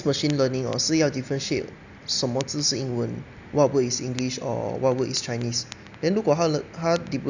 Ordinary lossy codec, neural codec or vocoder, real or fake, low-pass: none; none; real; 7.2 kHz